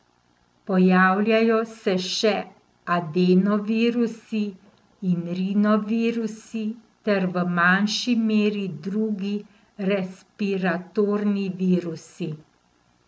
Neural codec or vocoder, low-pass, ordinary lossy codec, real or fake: none; none; none; real